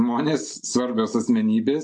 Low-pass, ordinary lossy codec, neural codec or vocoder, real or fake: 10.8 kHz; AAC, 64 kbps; none; real